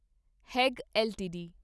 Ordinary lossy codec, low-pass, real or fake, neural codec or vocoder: none; none; real; none